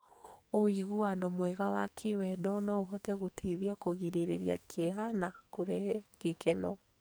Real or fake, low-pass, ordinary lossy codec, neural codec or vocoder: fake; none; none; codec, 44.1 kHz, 2.6 kbps, SNAC